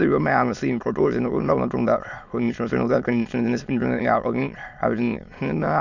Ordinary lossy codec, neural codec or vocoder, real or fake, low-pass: none; autoencoder, 22.05 kHz, a latent of 192 numbers a frame, VITS, trained on many speakers; fake; 7.2 kHz